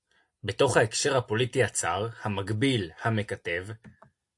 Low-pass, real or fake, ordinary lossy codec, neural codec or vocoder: 10.8 kHz; real; AAC, 64 kbps; none